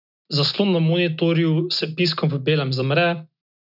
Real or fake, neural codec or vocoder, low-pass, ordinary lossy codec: real; none; 5.4 kHz; none